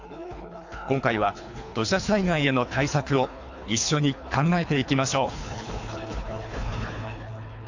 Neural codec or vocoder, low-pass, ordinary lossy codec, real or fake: codec, 24 kHz, 3 kbps, HILCodec; 7.2 kHz; AAC, 48 kbps; fake